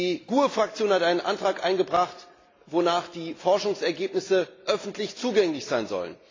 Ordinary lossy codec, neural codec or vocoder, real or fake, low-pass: AAC, 32 kbps; none; real; 7.2 kHz